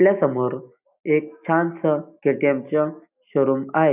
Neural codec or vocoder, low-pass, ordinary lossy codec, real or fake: none; 3.6 kHz; none; real